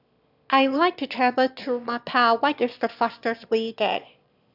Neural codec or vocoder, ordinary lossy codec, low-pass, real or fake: autoencoder, 22.05 kHz, a latent of 192 numbers a frame, VITS, trained on one speaker; none; 5.4 kHz; fake